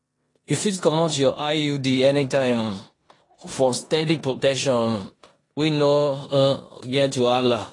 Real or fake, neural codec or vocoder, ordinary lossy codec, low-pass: fake; codec, 16 kHz in and 24 kHz out, 0.9 kbps, LongCat-Audio-Codec, four codebook decoder; AAC, 32 kbps; 10.8 kHz